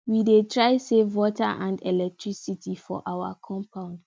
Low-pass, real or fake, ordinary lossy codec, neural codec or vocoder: none; real; none; none